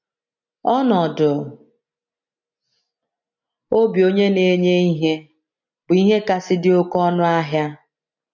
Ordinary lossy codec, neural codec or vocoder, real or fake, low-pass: none; none; real; 7.2 kHz